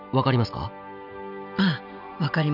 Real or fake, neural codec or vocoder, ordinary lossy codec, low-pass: real; none; none; 5.4 kHz